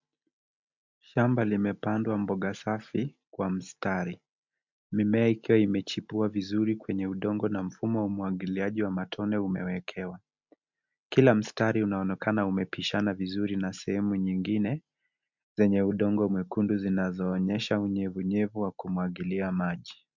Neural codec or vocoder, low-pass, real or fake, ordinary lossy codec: none; 7.2 kHz; real; MP3, 64 kbps